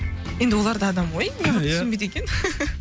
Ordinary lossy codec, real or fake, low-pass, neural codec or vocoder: none; real; none; none